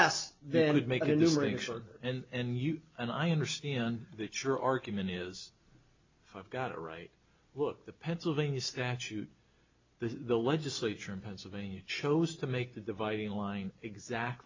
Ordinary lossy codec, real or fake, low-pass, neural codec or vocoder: MP3, 64 kbps; real; 7.2 kHz; none